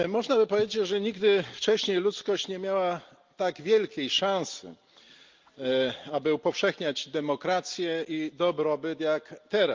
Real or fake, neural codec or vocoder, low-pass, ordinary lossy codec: real; none; 7.2 kHz; Opus, 32 kbps